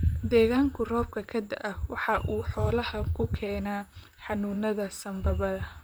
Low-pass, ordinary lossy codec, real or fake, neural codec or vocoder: none; none; fake; vocoder, 44.1 kHz, 128 mel bands, Pupu-Vocoder